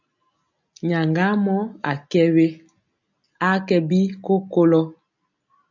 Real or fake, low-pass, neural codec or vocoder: real; 7.2 kHz; none